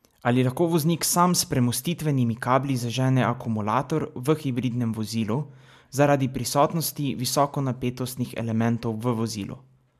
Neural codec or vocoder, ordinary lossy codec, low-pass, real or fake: none; MP3, 96 kbps; 14.4 kHz; real